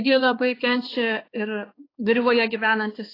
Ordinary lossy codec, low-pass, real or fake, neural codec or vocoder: AAC, 24 kbps; 5.4 kHz; fake; codec, 16 kHz, 2 kbps, X-Codec, HuBERT features, trained on balanced general audio